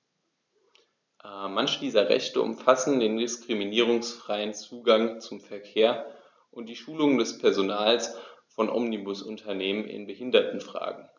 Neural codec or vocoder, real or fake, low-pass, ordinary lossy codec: none; real; 7.2 kHz; none